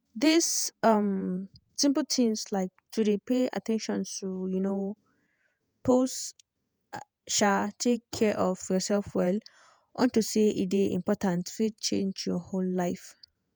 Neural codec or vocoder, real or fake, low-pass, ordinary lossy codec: vocoder, 48 kHz, 128 mel bands, Vocos; fake; none; none